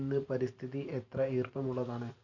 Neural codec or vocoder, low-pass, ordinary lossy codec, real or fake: vocoder, 44.1 kHz, 128 mel bands every 512 samples, BigVGAN v2; 7.2 kHz; none; fake